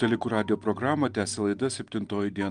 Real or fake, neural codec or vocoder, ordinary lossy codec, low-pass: real; none; Opus, 32 kbps; 9.9 kHz